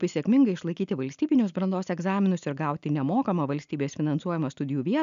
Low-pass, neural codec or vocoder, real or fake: 7.2 kHz; none; real